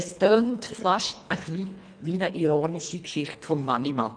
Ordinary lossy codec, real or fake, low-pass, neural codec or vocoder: none; fake; 9.9 kHz; codec, 24 kHz, 1.5 kbps, HILCodec